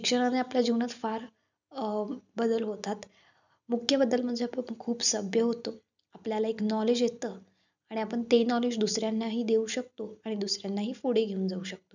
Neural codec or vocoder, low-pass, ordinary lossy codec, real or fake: none; 7.2 kHz; none; real